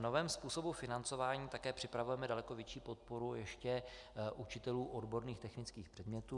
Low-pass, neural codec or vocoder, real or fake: 10.8 kHz; none; real